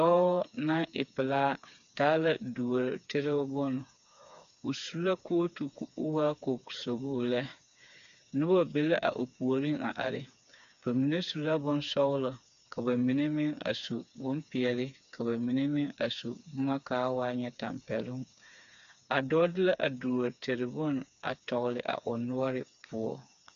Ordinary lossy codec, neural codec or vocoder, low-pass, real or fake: MP3, 64 kbps; codec, 16 kHz, 4 kbps, FreqCodec, smaller model; 7.2 kHz; fake